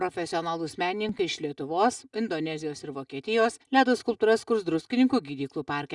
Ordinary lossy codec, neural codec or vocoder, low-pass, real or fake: Opus, 64 kbps; none; 10.8 kHz; real